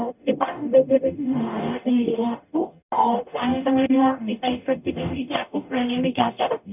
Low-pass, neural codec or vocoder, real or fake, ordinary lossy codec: 3.6 kHz; codec, 44.1 kHz, 0.9 kbps, DAC; fake; none